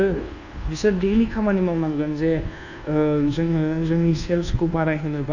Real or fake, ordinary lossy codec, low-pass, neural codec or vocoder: fake; none; 7.2 kHz; codec, 24 kHz, 1.2 kbps, DualCodec